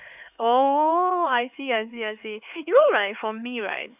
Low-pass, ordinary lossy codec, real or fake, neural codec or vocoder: 3.6 kHz; none; fake; codec, 16 kHz, 4 kbps, X-Codec, HuBERT features, trained on LibriSpeech